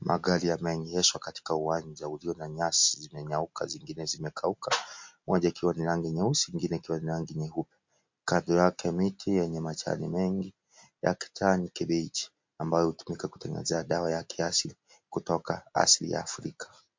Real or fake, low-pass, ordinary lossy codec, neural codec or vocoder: real; 7.2 kHz; MP3, 48 kbps; none